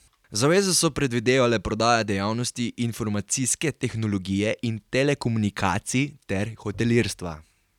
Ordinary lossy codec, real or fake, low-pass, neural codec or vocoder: none; real; 19.8 kHz; none